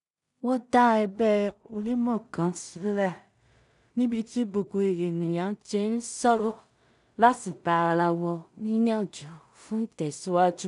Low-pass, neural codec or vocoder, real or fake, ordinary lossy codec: 10.8 kHz; codec, 16 kHz in and 24 kHz out, 0.4 kbps, LongCat-Audio-Codec, two codebook decoder; fake; none